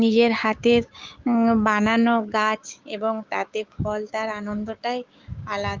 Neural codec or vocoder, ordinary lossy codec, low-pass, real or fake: codec, 16 kHz, 6 kbps, DAC; Opus, 24 kbps; 7.2 kHz; fake